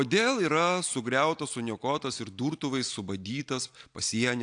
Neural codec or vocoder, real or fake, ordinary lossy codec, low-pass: none; real; AAC, 64 kbps; 9.9 kHz